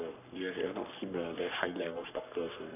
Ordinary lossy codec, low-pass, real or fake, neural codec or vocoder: none; 3.6 kHz; fake; codec, 44.1 kHz, 3.4 kbps, Pupu-Codec